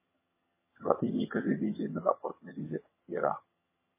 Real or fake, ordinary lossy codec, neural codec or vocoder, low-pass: fake; MP3, 16 kbps; vocoder, 22.05 kHz, 80 mel bands, HiFi-GAN; 3.6 kHz